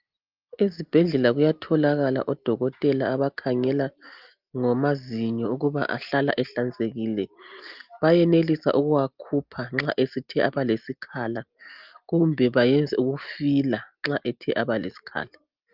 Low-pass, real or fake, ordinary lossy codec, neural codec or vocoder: 5.4 kHz; real; Opus, 32 kbps; none